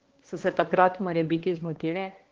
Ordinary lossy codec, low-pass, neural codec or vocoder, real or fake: Opus, 16 kbps; 7.2 kHz; codec, 16 kHz, 1 kbps, X-Codec, HuBERT features, trained on balanced general audio; fake